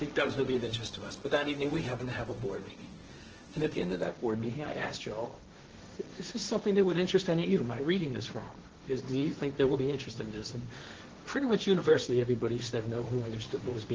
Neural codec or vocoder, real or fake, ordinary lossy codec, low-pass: codec, 16 kHz, 1.1 kbps, Voila-Tokenizer; fake; Opus, 16 kbps; 7.2 kHz